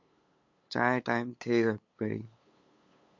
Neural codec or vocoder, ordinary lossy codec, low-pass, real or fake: codec, 16 kHz, 8 kbps, FunCodec, trained on LibriTTS, 25 frames a second; MP3, 48 kbps; 7.2 kHz; fake